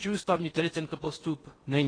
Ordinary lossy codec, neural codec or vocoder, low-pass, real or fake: AAC, 32 kbps; codec, 16 kHz in and 24 kHz out, 0.8 kbps, FocalCodec, streaming, 65536 codes; 9.9 kHz; fake